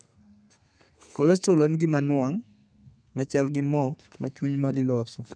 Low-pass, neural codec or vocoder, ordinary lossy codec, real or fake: 9.9 kHz; codec, 32 kHz, 1.9 kbps, SNAC; none; fake